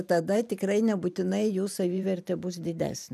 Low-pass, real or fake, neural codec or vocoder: 14.4 kHz; fake; vocoder, 44.1 kHz, 128 mel bands every 512 samples, BigVGAN v2